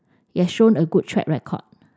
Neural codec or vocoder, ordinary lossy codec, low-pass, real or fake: none; none; none; real